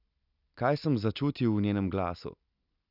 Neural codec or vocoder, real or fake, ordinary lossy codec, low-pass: none; real; none; 5.4 kHz